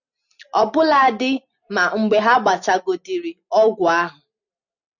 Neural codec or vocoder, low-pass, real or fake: none; 7.2 kHz; real